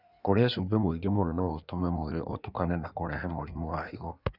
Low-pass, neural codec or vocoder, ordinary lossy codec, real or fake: 5.4 kHz; codec, 16 kHz in and 24 kHz out, 1.1 kbps, FireRedTTS-2 codec; none; fake